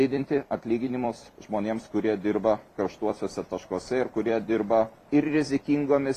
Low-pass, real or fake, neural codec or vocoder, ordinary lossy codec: 14.4 kHz; fake; vocoder, 44.1 kHz, 128 mel bands every 256 samples, BigVGAN v2; AAC, 48 kbps